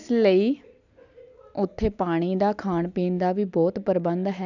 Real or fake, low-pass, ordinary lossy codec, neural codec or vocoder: real; 7.2 kHz; none; none